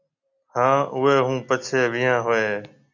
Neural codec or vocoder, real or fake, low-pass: none; real; 7.2 kHz